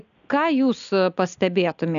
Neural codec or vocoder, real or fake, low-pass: none; real; 7.2 kHz